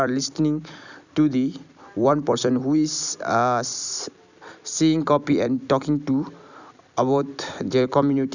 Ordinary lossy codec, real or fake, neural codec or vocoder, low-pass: none; fake; vocoder, 44.1 kHz, 128 mel bands every 256 samples, BigVGAN v2; 7.2 kHz